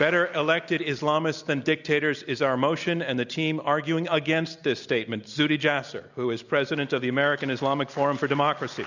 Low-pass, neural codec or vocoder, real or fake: 7.2 kHz; none; real